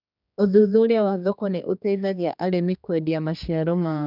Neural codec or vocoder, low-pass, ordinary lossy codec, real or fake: codec, 16 kHz, 2 kbps, X-Codec, HuBERT features, trained on general audio; 5.4 kHz; none; fake